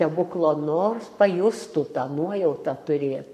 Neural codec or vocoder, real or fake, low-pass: codec, 44.1 kHz, 7.8 kbps, Pupu-Codec; fake; 14.4 kHz